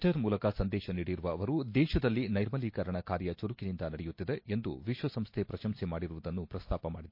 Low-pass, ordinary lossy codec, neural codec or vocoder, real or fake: 5.4 kHz; MP3, 32 kbps; none; real